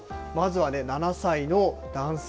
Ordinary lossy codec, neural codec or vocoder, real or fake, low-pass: none; none; real; none